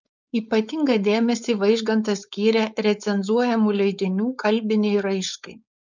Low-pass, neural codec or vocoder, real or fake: 7.2 kHz; codec, 16 kHz, 4.8 kbps, FACodec; fake